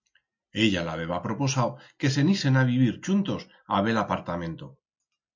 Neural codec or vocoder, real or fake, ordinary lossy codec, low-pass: none; real; MP3, 48 kbps; 7.2 kHz